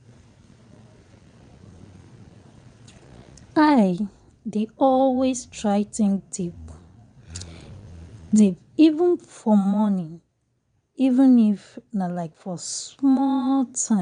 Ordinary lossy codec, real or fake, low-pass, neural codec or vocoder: none; fake; 9.9 kHz; vocoder, 22.05 kHz, 80 mel bands, Vocos